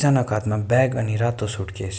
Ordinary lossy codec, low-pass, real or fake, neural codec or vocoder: none; none; real; none